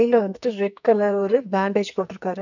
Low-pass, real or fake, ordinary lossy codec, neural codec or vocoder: 7.2 kHz; fake; none; codec, 44.1 kHz, 2.6 kbps, SNAC